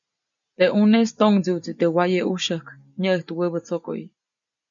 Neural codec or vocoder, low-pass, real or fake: none; 7.2 kHz; real